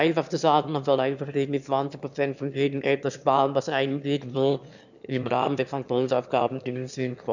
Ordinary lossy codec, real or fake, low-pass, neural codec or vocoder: none; fake; 7.2 kHz; autoencoder, 22.05 kHz, a latent of 192 numbers a frame, VITS, trained on one speaker